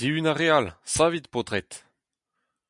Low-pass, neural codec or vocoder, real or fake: 10.8 kHz; none; real